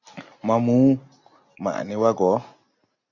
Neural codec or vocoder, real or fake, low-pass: none; real; 7.2 kHz